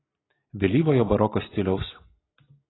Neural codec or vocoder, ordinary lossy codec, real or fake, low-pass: none; AAC, 16 kbps; real; 7.2 kHz